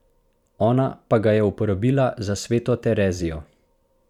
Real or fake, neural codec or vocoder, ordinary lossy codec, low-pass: fake; vocoder, 44.1 kHz, 128 mel bands every 512 samples, BigVGAN v2; none; 19.8 kHz